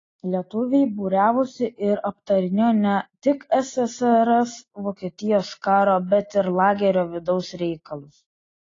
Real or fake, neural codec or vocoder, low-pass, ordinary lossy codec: real; none; 7.2 kHz; AAC, 32 kbps